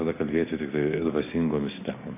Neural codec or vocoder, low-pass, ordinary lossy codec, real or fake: none; 3.6 kHz; AAC, 16 kbps; real